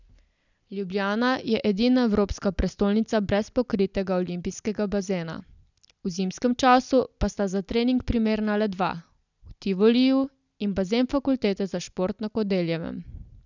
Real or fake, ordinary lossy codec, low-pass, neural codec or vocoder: real; none; 7.2 kHz; none